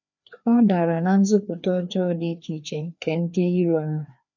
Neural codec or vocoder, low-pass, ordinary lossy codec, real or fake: codec, 16 kHz, 2 kbps, FreqCodec, larger model; 7.2 kHz; none; fake